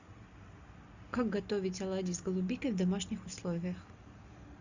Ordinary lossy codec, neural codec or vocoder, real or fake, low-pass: Opus, 64 kbps; none; real; 7.2 kHz